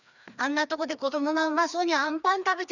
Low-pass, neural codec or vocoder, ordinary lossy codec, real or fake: 7.2 kHz; codec, 16 kHz, 2 kbps, FreqCodec, larger model; none; fake